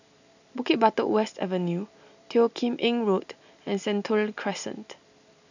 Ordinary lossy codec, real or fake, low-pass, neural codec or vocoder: none; real; 7.2 kHz; none